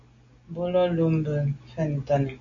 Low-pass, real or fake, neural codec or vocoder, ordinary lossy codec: 7.2 kHz; real; none; Opus, 64 kbps